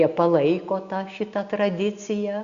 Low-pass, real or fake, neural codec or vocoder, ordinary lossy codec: 7.2 kHz; real; none; Opus, 64 kbps